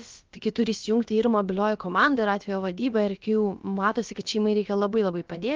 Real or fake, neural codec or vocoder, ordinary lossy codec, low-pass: fake; codec, 16 kHz, about 1 kbps, DyCAST, with the encoder's durations; Opus, 32 kbps; 7.2 kHz